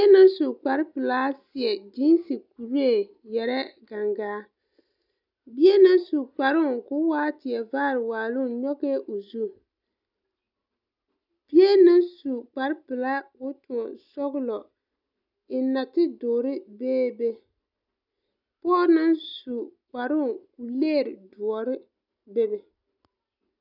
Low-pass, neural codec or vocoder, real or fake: 5.4 kHz; none; real